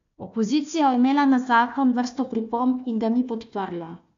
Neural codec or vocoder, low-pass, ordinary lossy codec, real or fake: codec, 16 kHz, 1 kbps, FunCodec, trained on Chinese and English, 50 frames a second; 7.2 kHz; AAC, 64 kbps; fake